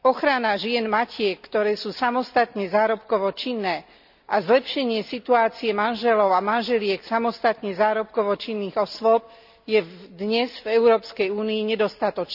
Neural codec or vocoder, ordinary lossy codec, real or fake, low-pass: none; none; real; 5.4 kHz